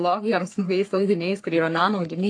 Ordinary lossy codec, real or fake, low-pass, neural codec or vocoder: AAC, 48 kbps; fake; 9.9 kHz; codec, 44.1 kHz, 3.4 kbps, Pupu-Codec